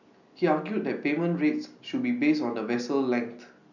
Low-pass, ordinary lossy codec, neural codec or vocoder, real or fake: 7.2 kHz; none; none; real